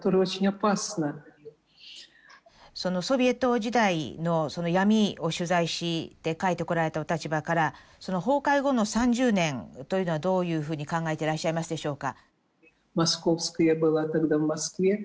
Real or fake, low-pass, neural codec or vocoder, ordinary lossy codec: real; none; none; none